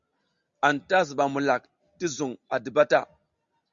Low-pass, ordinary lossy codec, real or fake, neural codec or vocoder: 7.2 kHz; Opus, 64 kbps; real; none